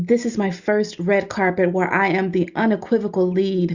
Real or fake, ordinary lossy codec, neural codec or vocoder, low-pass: real; Opus, 64 kbps; none; 7.2 kHz